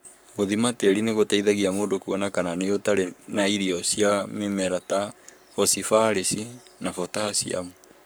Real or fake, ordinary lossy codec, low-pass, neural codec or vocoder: fake; none; none; codec, 44.1 kHz, 7.8 kbps, Pupu-Codec